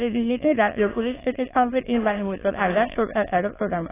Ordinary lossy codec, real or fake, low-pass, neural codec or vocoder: AAC, 16 kbps; fake; 3.6 kHz; autoencoder, 22.05 kHz, a latent of 192 numbers a frame, VITS, trained on many speakers